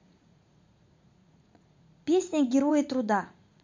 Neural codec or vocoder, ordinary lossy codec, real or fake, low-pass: vocoder, 44.1 kHz, 80 mel bands, Vocos; MP3, 48 kbps; fake; 7.2 kHz